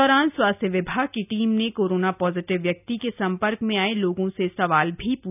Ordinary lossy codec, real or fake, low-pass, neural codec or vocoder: none; real; 3.6 kHz; none